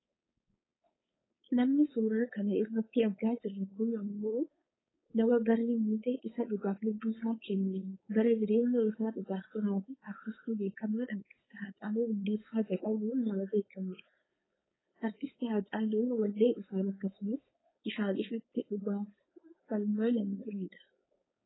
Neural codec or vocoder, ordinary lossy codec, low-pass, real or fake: codec, 16 kHz, 4.8 kbps, FACodec; AAC, 16 kbps; 7.2 kHz; fake